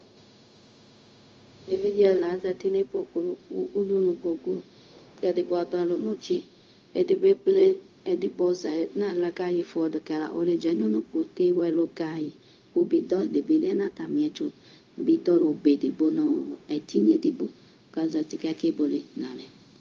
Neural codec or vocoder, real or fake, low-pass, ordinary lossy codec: codec, 16 kHz, 0.4 kbps, LongCat-Audio-Codec; fake; 7.2 kHz; Opus, 32 kbps